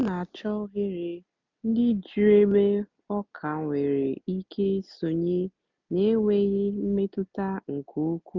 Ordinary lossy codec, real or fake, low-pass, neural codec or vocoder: none; real; 7.2 kHz; none